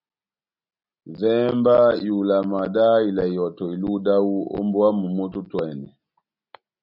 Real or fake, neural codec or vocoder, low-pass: real; none; 5.4 kHz